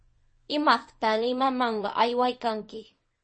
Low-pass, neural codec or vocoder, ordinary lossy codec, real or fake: 9.9 kHz; codec, 24 kHz, 0.9 kbps, WavTokenizer, small release; MP3, 32 kbps; fake